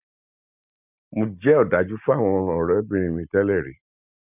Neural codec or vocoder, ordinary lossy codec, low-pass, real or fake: none; none; 3.6 kHz; real